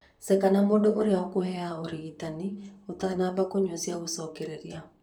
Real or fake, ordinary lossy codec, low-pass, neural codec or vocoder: fake; none; 19.8 kHz; vocoder, 44.1 kHz, 128 mel bands, Pupu-Vocoder